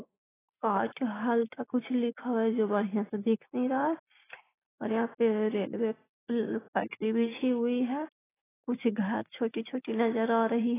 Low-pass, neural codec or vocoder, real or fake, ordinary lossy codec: 3.6 kHz; none; real; AAC, 16 kbps